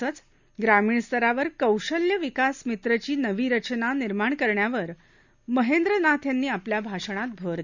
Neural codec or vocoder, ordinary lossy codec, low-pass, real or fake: none; none; 7.2 kHz; real